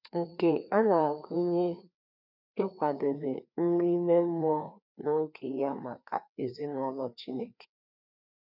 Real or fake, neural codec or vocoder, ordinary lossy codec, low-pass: fake; codec, 16 kHz, 2 kbps, FreqCodec, larger model; none; 5.4 kHz